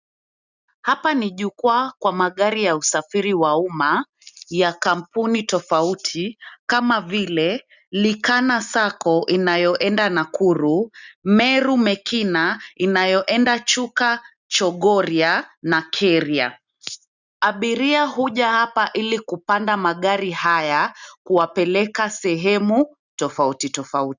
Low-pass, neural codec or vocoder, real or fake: 7.2 kHz; none; real